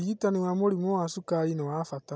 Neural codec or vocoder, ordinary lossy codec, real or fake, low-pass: none; none; real; none